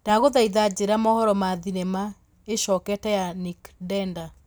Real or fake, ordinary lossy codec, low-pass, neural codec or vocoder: real; none; none; none